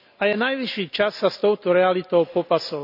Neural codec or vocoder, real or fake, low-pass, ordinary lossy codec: none; real; 5.4 kHz; none